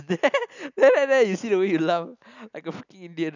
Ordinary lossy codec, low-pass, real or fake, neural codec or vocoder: none; 7.2 kHz; real; none